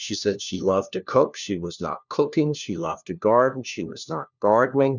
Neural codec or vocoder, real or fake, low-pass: codec, 16 kHz, 1 kbps, FunCodec, trained on LibriTTS, 50 frames a second; fake; 7.2 kHz